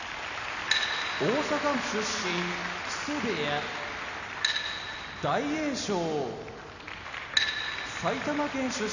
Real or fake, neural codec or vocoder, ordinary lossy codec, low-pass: real; none; none; 7.2 kHz